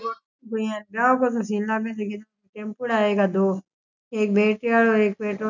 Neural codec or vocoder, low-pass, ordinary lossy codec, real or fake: none; 7.2 kHz; none; real